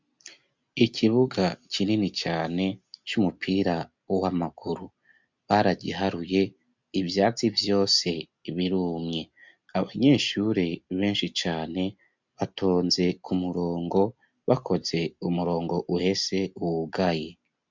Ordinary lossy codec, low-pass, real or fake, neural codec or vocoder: MP3, 64 kbps; 7.2 kHz; real; none